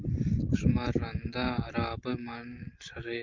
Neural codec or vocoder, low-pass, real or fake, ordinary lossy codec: none; 7.2 kHz; real; Opus, 24 kbps